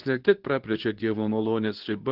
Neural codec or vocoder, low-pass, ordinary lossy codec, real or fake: codec, 24 kHz, 0.9 kbps, WavTokenizer, medium speech release version 2; 5.4 kHz; Opus, 16 kbps; fake